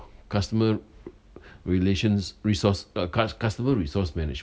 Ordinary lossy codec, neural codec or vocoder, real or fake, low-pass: none; none; real; none